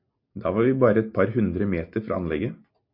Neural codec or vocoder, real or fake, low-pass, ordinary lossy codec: none; real; 5.4 kHz; MP3, 32 kbps